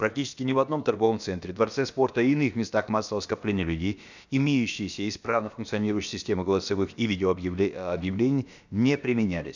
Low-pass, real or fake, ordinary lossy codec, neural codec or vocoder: 7.2 kHz; fake; none; codec, 16 kHz, about 1 kbps, DyCAST, with the encoder's durations